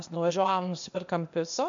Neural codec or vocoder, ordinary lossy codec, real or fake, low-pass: codec, 16 kHz, 0.8 kbps, ZipCodec; MP3, 64 kbps; fake; 7.2 kHz